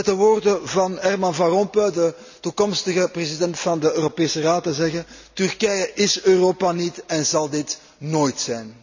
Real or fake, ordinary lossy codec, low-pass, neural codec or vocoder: real; none; 7.2 kHz; none